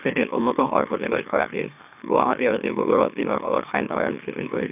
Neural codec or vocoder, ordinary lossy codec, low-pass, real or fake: autoencoder, 44.1 kHz, a latent of 192 numbers a frame, MeloTTS; none; 3.6 kHz; fake